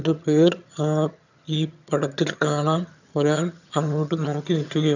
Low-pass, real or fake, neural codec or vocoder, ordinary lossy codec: 7.2 kHz; fake; vocoder, 22.05 kHz, 80 mel bands, HiFi-GAN; none